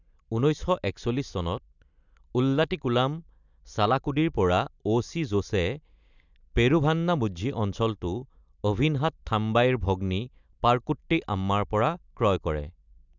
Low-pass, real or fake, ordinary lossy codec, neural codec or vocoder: 7.2 kHz; real; none; none